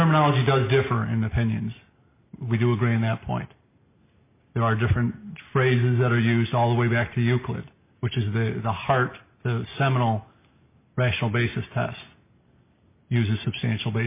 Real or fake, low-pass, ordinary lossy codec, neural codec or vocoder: real; 3.6 kHz; MP3, 24 kbps; none